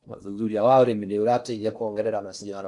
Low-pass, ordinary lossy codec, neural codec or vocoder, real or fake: 10.8 kHz; none; codec, 16 kHz in and 24 kHz out, 0.6 kbps, FocalCodec, streaming, 4096 codes; fake